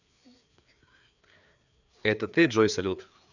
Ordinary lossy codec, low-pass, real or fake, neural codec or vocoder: none; 7.2 kHz; fake; codec, 16 kHz, 4 kbps, FreqCodec, larger model